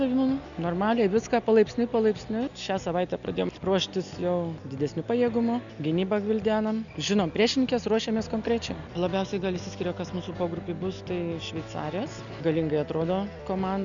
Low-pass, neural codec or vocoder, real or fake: 7.2 kHz; none; real